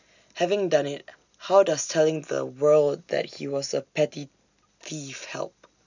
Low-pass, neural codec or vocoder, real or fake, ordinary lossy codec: 7.2 kHz; none; real; none